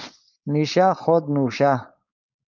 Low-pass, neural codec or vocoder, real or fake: 7.2 kHz; codec, 16 kHz, 4.8 kbps, FACodec; fake